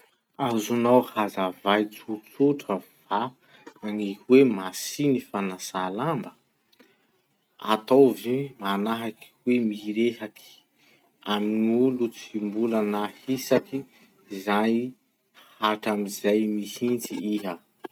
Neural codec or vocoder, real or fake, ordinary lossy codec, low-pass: none; real; none; 19.8 kHz